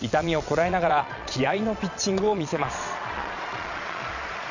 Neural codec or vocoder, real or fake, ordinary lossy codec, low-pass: vocoder, 22.05 kHz, 80 mel bands, Vocos; fake; none; 7.2 kHz